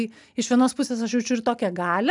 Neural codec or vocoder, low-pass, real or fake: none; 10.8 kHz; real